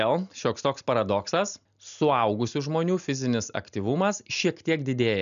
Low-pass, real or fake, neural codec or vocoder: 7.2 kHz; real; none